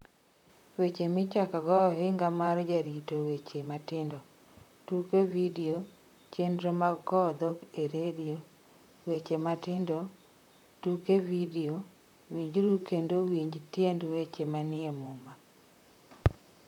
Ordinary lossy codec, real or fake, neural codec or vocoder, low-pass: none; fake; vocoder, 44.1 kHz, 128 mel bands, Pupu-Vocoder; 19.8 kHz